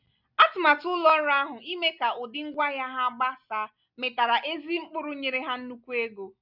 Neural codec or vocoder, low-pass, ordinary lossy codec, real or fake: none; 5.4 kHz; none; real